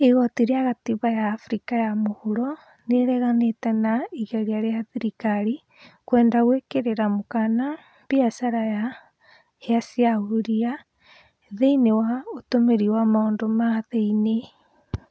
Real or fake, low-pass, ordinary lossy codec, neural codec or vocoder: real; none; none; none